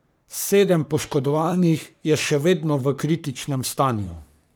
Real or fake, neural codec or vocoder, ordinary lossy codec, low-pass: fake; codec, 44.1 kHz, 3.4 kbps, Pupu-Codec; none; none